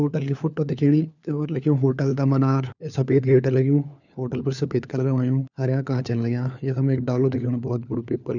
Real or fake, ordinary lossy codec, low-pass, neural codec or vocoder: fake; none; 7.2 kHz; codec, 16 kHz, 4 kbps, FunCodec, trained on LibriTTS, 50 frames a second